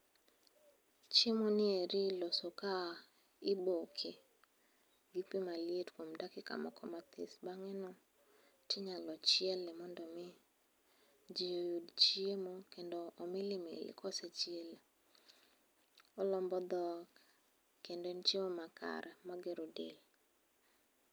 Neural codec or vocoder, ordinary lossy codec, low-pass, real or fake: none; none; none; real